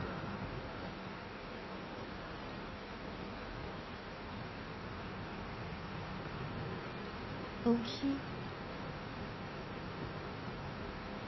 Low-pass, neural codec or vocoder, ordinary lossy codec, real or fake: 7.2 kHz; codec, 16 kHz in and 24 kHz out, 1.1 kbps, FireRedTTS-2 codec; MP3, 24 kbps; fake